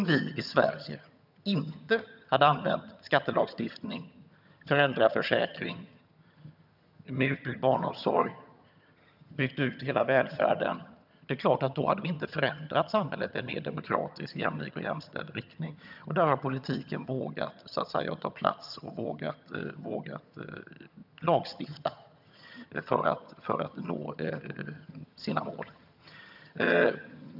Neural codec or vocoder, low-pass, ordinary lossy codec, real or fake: vocoder, 22.05 kHz, 80 mel bands, HiFi-GAN; 5.4 kHz; none; fake